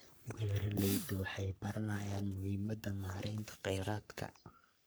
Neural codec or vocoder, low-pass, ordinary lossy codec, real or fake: codec, 44.1 kHz, 3.4 kbps, Pupu-Codec; none; none; fake